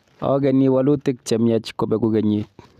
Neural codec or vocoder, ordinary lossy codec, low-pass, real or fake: none; none; 14.4 kHz; real